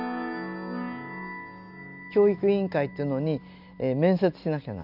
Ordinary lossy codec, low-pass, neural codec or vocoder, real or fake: none; 5.4 kHz; none; real